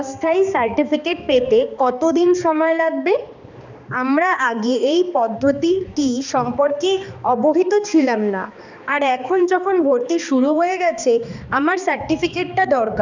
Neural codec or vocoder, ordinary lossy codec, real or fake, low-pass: codec, 16 kHz, 2 kbps, X-Codec, HuBERT features, trained on balanced general audio; none; fake; 7.2 kHz